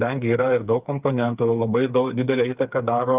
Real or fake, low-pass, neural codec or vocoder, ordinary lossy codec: fake; 3.6 kHz; codec, 16 kHz, 4 kbps, FreqCodec, smaller model; Opus, 24 kbps